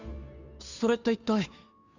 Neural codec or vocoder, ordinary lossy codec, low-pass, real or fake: codec, 16 kHz, 2 kbps, FunCodec, trained on Chinese and English, 25 frames a second; none; 7.2 kHz; fake